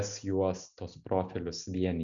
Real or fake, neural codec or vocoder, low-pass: real; none; 7.2 kHz